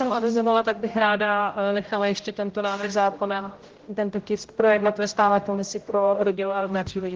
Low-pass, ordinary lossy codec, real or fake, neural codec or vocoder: 7.2 kHz; Opus, 16 kbps; fake; codec, 16 kHz, 0.5 kbps, X-Codec, HuBERT features, trained on general audio